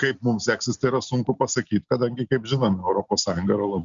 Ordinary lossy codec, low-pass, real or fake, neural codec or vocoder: Opus, 64 kbps; 7.2 kHz; real; none